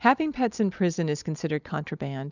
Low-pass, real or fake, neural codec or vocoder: 7.2 kHz; real; none